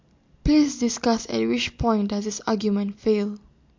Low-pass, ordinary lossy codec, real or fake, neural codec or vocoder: 7.2 kHz; MP3, 48 kbps; real; none